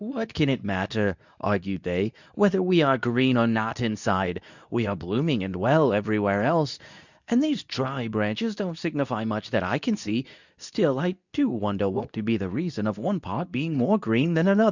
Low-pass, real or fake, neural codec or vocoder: 7.2 kHz; fake; codec, 24 kHz, 0.9 kbps, WavTokenizer, medium speech release version 2